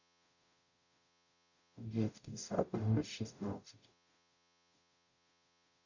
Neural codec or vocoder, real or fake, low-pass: codec, 44.1 kHz, 0.9 kbps, DAC; fake; 7.2 kHz